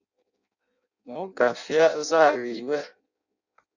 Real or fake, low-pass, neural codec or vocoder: fake; 7.2 kHz; codec, 16 kHz in and 24 kHz out, 0.6 kbps, FireRedTTS-2 codec